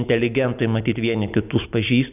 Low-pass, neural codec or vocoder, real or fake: 3.6 kHz; none; real